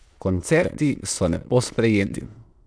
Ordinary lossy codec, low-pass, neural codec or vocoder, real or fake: none; none; autoencoder, 22.05 kHz, a latent of 192 numbers a frame, VITS, trained on many speakers; fake